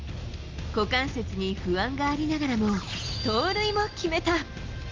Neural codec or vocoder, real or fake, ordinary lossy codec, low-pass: none; real; Opus, 32 kbps; 7.2 kHz